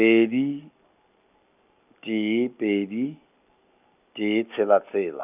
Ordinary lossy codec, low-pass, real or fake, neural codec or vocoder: none; 3.6 kHz; real; none